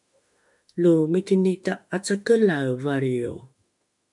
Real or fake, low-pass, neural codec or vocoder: fake; 10.8 kHz; autoencoder, 48 kHz, 32 numbers a frame, DAC-VAE, trained on Japanese speech